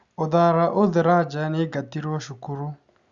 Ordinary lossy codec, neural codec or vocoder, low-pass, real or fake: Opus, 64 kbps; none; 7.2 kHz; real